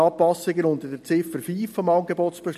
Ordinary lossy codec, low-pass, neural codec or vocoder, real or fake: MP3, 64 kbps; 14.4 kHz; none; real